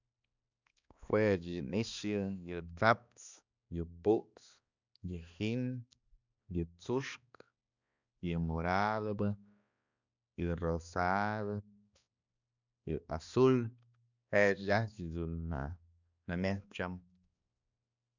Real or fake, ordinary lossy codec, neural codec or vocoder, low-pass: fake; none; codec, 16 kHz, 2 kbps, X-Codec, HuBERT features, trained on balanced general audio; 7.2 kHz